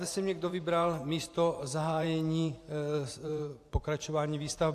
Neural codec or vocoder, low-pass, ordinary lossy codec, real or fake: vocoder, 44.1 kHz, 128 mel bands every 512 samples, BigVGAN v2; 14.4 kHz; AAC, 64 kbps; fake